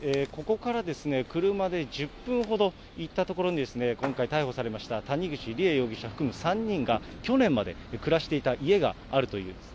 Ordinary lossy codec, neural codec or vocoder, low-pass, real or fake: none; none; none; real